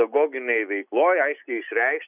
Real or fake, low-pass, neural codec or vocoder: real; 3.6 kHz; none